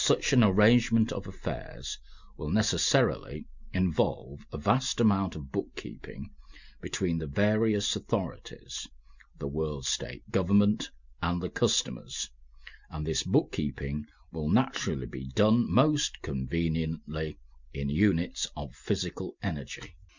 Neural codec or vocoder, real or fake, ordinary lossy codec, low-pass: none; real; Opus, 64 kbps; 7.2 kHz